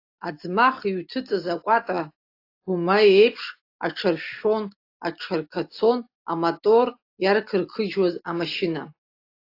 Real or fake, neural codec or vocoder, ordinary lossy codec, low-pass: real; none; AAC, 32 kbps; 5.4 kHz